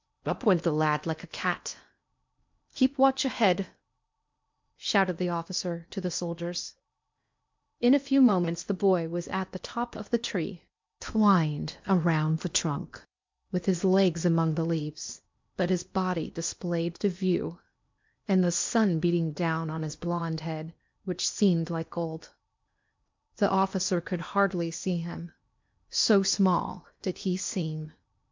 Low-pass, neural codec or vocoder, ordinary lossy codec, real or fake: 7.2 kHz; codec, 16 kHz in and 24 kHz out, 0.8 kbps, FocalCodec, streaming, 65536 codes; MP3, 64 kbps; fake